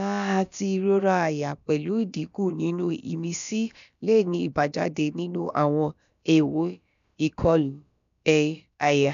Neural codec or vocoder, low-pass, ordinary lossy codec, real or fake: codec, 16 kHz, about 1 kbps, DyCAST, with the encoder's durations; 7.2 kHz; none; fake